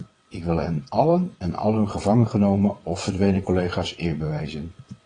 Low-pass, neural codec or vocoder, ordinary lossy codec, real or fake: 9.9 kHz; vocoder, 22.05 kHz, 80 mel bands, WaveNeXt; AAC, 32 kbps; fake